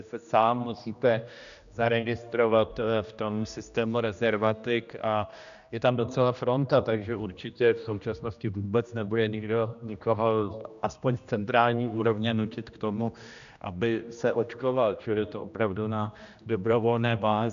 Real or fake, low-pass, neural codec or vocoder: fake; 7.2 kHz; codec, 16 kHz, 1 kbps, X-Codec, HuBERT features, trained on general audio